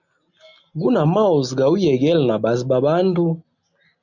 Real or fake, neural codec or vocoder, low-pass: real; none; 7.2 kHz